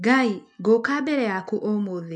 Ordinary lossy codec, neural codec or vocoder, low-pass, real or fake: MP3, 64 kbps; none; 9.9 kHz; real